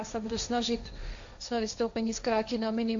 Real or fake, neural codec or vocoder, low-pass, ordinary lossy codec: fake; codec, 16 kHz, 1.1 kbps, Voila-Tokenizer; 7.2 kHz; AAC, 64 kbps